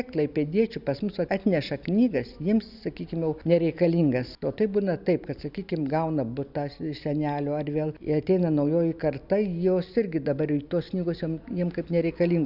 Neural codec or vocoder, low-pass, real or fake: none; 5.4 kHz; real